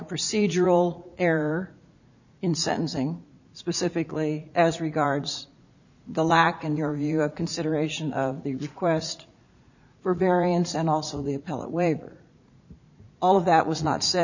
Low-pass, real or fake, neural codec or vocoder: 7.2 kHz; fake; vocoder, 44.1 kHz, 80 mel bands, Vocos